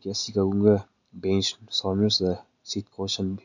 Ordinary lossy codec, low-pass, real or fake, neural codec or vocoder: none; 7.2 kHz; real; none